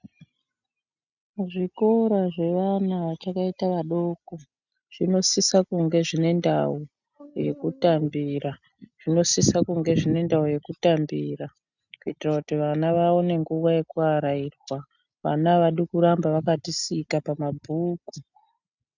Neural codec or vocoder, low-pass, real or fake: none; 7.2 kHz; real